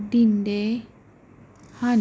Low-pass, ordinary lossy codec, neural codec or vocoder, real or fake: none; none; none; real